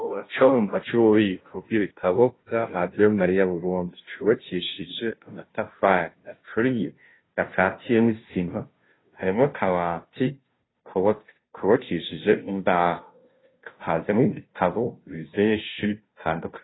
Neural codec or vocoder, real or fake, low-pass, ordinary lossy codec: codec, 16 kHz, 0.5 kbps, FunCodec, trained on Chinese and English, 25 frames a second; fake; 7.2 kHz; AAC, 16 kbps